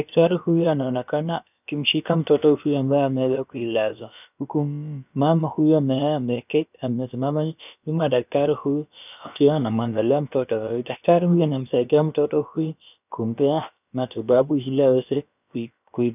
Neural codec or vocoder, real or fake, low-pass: codec, 16 kHz, about 1 kbps, DyCAST, with the encoder's durations; fake; 3.6 kHz